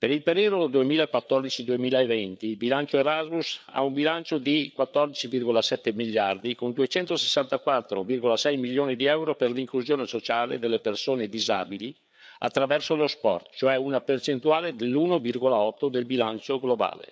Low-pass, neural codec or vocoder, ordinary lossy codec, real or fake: none; codec, 16 kHz, 4 kbps, FreqCodec, larger model; none; fake